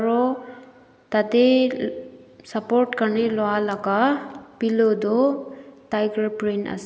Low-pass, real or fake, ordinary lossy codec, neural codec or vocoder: none; real; none; none